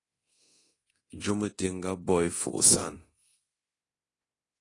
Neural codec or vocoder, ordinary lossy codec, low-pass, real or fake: codec, 24 kHz, 0.9 kbps, DualCodec; AAC, 32 kbps; 10.8 kHz; fake